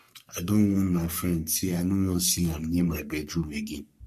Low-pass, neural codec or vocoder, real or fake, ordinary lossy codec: 14.4 kHz; codec, 44.1 kHz, 3.4 kbps, Pupu-Codec; fake; MP3, 64 kbps